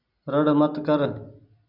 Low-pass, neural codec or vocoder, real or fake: 5.4 kHz; none; real